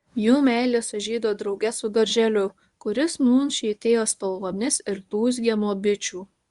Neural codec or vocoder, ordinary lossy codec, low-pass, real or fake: codec, 24 kHz, 0.9 kbps, WavTokenizer, medium speech release version 1; Opus, 64 kbps; 10.8 kHz; fake